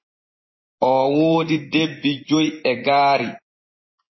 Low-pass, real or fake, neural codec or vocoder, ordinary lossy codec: 7.2 kHz; fake; vocoder, 24 kHz, 100 mel bands, Vocos; MP3, 24 kbps